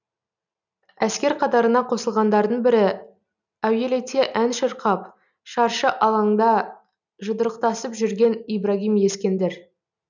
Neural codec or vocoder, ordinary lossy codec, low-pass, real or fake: none; none; 7.2 kHz; real